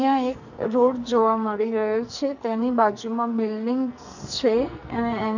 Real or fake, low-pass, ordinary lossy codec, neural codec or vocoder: fake; 7.2 kHz; none; codec, 44.1 kHz, 2.6 kbps, SNAC